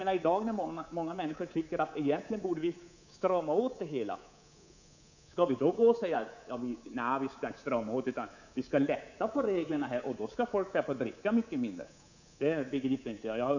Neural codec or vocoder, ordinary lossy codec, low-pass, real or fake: codec, 24 kHz, 3.1 kbps, DualCodec; none; 7.2 kHz; fake